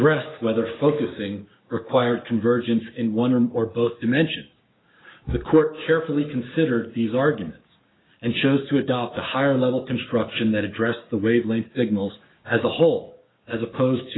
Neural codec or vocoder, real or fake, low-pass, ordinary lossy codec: codec, 16 kHz, 6 kbps, DAC; fake; 7.2 kHz; AAC, 16 kbps